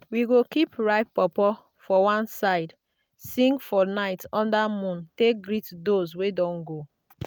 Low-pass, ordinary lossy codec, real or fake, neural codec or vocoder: none; none; real; none